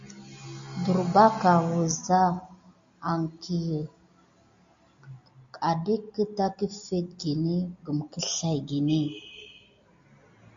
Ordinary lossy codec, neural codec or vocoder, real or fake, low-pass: AAC, 64 kbps; none; real; 7.2 kHz